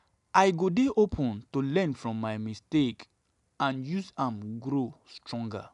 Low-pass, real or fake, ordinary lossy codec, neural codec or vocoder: 10.8 kHz; real; none; none